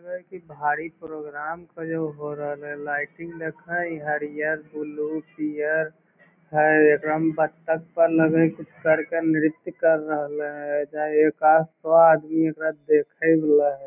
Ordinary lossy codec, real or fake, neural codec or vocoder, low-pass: none; real; none; 3.6 kHz